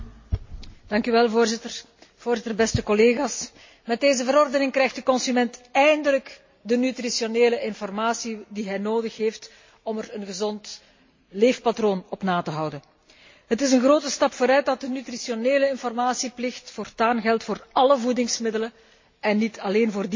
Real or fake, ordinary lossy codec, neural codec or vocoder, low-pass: real; MP3, 32 kbps; none; 7.2 kHz